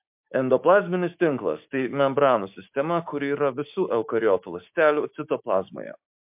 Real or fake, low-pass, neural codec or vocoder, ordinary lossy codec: fake; 3.6 kHz; codec, 16 kHz, 6 kbps, DAC; MP3, 32 kbps